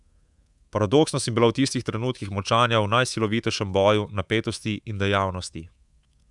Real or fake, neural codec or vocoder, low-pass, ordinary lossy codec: fake; codec, 24 kHz, 3.1 kbps, DualCodec; 10.8 kHz; Opus, 64 kbps